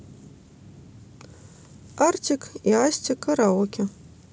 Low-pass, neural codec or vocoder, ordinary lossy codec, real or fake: none; none; none; real